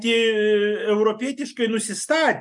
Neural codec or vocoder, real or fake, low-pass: none; real; 10.8 kHz